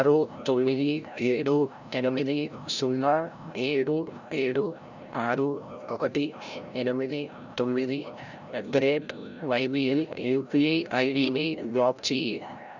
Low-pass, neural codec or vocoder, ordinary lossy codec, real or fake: 7.2 kHz; codec, 16 kHz, 0.5 kbps, FreqCodec, larger model; none; fake